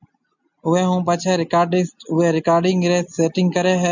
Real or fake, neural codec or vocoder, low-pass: real; none; 7.2 kHz